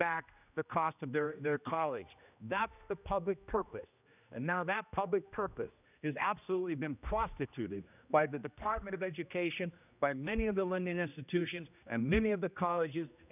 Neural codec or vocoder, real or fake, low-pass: codec, 16 kHz, 1 kbps, X-Codec, HuBERT features, trained on general audio; fake; 3.6 kHz